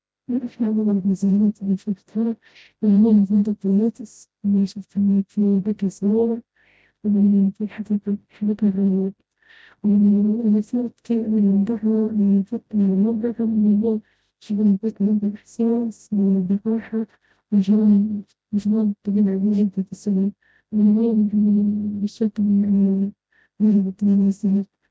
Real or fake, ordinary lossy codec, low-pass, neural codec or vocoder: fake; none; none; codec, 16 kHz, 0.5 kbps, FreqCodec, smaller model